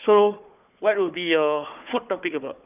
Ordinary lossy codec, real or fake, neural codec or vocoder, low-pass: none; fake; codec, 16 kHz, 4 kbps, FunCodec, trained on Chinese and English, 50 frames a second; 3.6 kHz